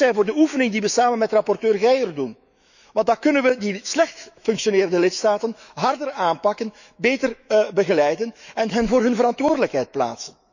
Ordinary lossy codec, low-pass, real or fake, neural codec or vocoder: none; 7.2 kHz; fake; autoencoder, 48 kHz, 128 numbers a frame, DAC-VAE, trained on Japanese speech